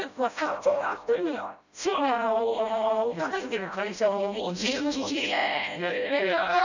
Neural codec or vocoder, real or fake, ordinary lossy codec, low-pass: codec, 16 kHz, 0.5 kbps, FreqCodec, smaller model; fake; none; 7.2 kHz